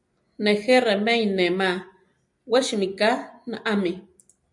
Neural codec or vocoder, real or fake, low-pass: none; real; 10.8 kHz